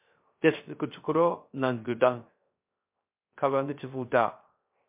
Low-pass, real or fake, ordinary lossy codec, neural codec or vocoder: 3.6 kHz; fake; MP3, 32 kbps; codec, 16 kHz, 0.3 kbps, FocalCodec